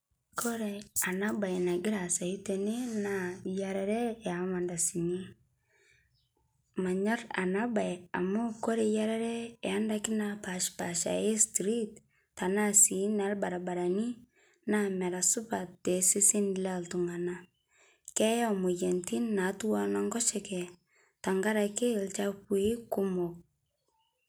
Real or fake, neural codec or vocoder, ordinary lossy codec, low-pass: real; none; none; none